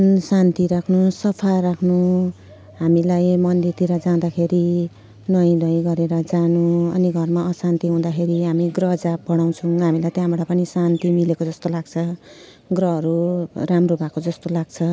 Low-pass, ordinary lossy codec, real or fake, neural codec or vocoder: none; none; real; none